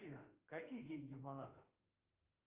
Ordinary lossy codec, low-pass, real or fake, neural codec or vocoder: Opus, 24 kbps; 3.6 kHz; fake; autoencoder, 48 kHz, 32 numbers a frame, DAC-VAE, trained on Japanese speech